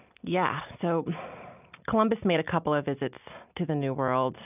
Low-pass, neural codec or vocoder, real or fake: 3.6 kHz; none; real